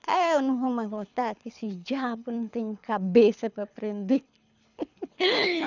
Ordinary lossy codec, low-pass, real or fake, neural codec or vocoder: none; 7.2 kHz; fake; codec, 24 kHz, 6 kbps, HILCodec